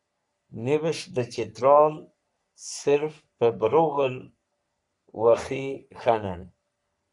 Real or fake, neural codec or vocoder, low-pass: fake; codec, 44.1 kHz, 3.4 kbps, Pupu-Codec; 10.8 kHz